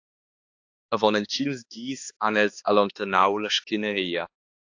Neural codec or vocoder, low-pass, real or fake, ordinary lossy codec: codec, 16 kHz, 2 kbps, X-Codec, HuBERT features, trained on balanced general audio; 7.2 kHz; fake; AAC, 48 kbps